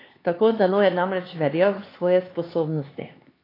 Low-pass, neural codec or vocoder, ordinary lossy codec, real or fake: 5.4 kHz; codec, 16 kHz, 4 kbps, X-Codec, HuBERT features, trained on LibriSpeech; AAC, 24 kbps; fake